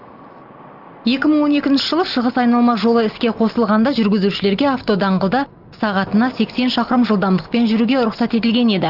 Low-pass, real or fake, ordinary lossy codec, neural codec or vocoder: 5.4 kHz; real; Opus, 32 kbps; none